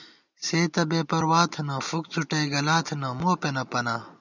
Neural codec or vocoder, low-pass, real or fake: none; 7.2 kHz; real